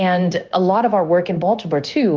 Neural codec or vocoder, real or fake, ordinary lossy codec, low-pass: codec, 16 kHz, 0.9 kbps, LongCat-Audio-Codec; fake; Opus, 24 kbps; 7.2 kHz